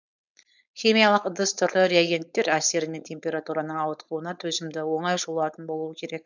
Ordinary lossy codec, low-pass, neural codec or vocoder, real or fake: none; 7.2 kHz; codec, 16 kHz, 4.8 kbps, FACodec; fake